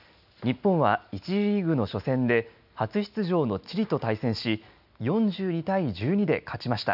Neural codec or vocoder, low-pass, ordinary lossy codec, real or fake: none; 5.4 kHz; none; real